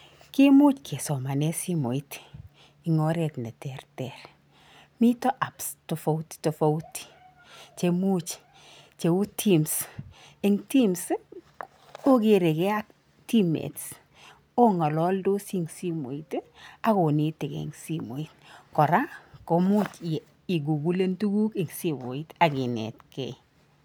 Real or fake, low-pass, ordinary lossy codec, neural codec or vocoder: real; none; none; none